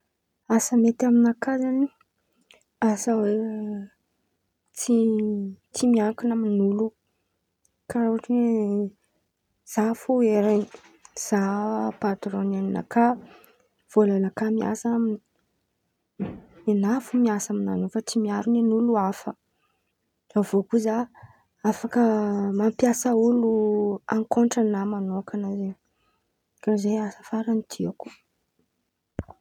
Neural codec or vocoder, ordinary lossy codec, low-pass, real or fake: none; none; 19.8 kHz; real